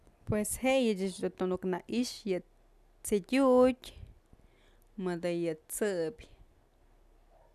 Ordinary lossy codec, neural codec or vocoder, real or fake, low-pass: none; none; real; 14.4 kHz